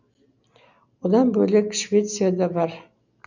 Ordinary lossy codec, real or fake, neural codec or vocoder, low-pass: none; real; none; 7.2 kHz